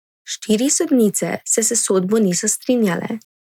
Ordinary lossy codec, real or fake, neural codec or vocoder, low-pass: none; real; none; 19.8 kHz